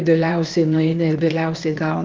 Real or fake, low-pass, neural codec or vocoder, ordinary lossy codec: fake; 7.2 kHz; codec, 16 kHz, 0.8 kbps, ZipCodec; Opus, 24 kbps